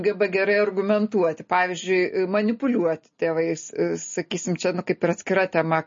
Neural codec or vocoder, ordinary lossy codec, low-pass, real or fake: none; MP3, 32 kbps; 7.2 kHz; real